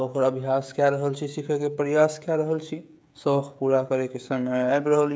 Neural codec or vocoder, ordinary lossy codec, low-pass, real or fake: codec, 16 kHz, 16 kbps, FreqCodec, smaller model; none; none; fake